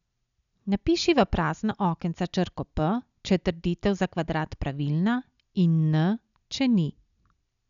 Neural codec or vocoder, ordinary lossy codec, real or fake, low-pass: none; none; real; 7.2 kHz